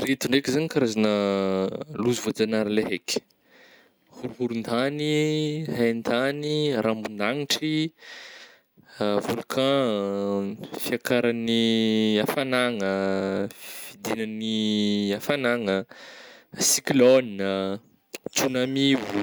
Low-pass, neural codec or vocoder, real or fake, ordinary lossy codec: none; none; real; none